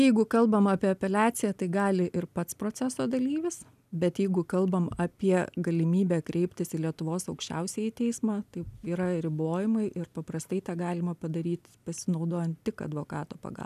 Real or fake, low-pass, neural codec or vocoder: real; 14.4 kHz; none